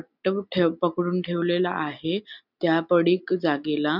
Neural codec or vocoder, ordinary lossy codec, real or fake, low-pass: none; none; real; 5.4 kHz